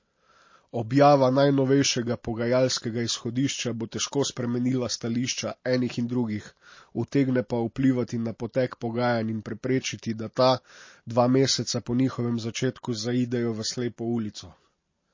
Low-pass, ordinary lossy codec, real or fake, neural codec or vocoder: 7.2 kHz; MP3, 32 kbps; real; none